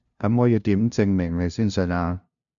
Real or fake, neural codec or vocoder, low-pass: fake; codec, 16 kHz, 0.5 kbps, FunCodec, trained on LibriTTS, 25 frames a second; 7.2 kHz